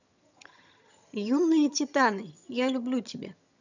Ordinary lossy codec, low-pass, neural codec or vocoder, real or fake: none; 7.2 kHz; vocoder, 22.05 kHz, 80 mel bands, HiFi-GAN; fake